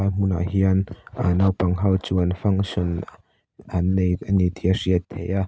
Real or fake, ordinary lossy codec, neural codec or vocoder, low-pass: real; none; none; none